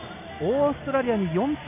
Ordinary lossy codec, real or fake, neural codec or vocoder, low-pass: none; real; none; 3.6 kHz